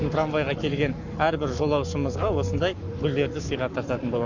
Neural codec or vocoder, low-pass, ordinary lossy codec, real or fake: codec, 44.1 kHz, 7.8 kbps, Pupu-Codec; 7.2 kHz; none; fake